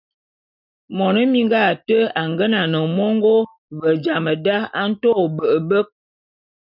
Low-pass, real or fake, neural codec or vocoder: 5.4 kHz; real; none